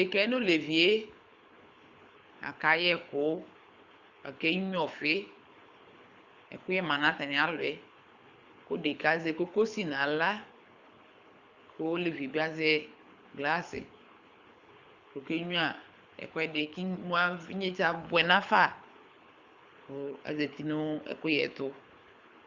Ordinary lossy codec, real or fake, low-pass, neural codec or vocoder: Opus, 64 kbps; fake; 7.2 kHz; codec, 24 kHz, 6 kbps, HILCodec